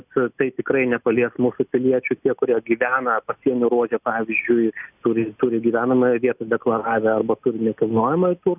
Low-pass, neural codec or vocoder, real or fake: 3.6 kHz; none; real